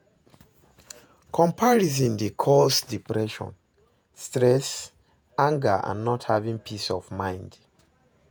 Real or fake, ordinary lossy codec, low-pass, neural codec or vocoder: fake; none; none; vocoder, 48 kHz, 128 mel bands, Vocos